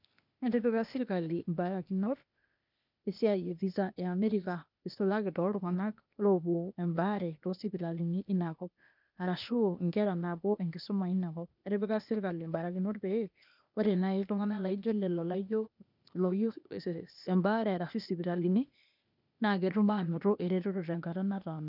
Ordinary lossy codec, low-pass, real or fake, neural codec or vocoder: none; 5.4 kHz; fake; codec, 16 kHz, 0.8 kbps, ZipCodec